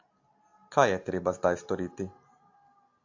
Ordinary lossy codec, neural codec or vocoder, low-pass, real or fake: AAC, 48 kbps; none; 7.2 kHz; real